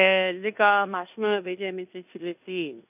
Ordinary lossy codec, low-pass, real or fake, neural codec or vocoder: none; 3.6 kHz; fake; codec, 16 kHz in and 24 kHz out, 0.9 kbps, LongCat-Audio-Codec, fine tuned four codebook decoder